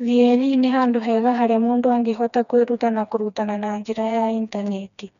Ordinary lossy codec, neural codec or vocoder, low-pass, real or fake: none; codec, 16 kHz, 2 kbps, FreqCodec, smaller model; 7.2 kHz; fake